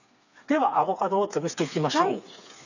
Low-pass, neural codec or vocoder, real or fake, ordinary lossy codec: 7.2 kHz; codec, 16 kHz, 4 kbps, FreqCodec, smaller model; fake; none